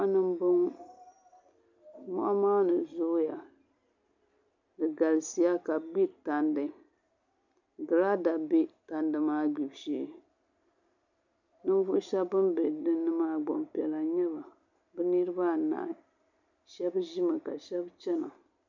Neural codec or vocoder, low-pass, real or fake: none; 7.2 kHz; real